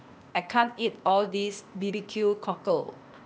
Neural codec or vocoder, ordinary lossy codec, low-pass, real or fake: codec, 16 kHz, 0.8 kbps, ZipCodec; none; none; fake